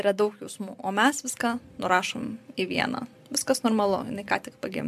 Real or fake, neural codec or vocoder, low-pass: fake; vocoder, 44.1 kHz, 128 mel bands every 256 samples, BigVGAN v2; 14.4 kHz